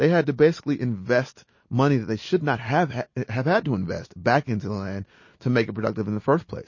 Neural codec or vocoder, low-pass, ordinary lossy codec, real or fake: none; 7.2 kHz; MP3, 32 kbps; real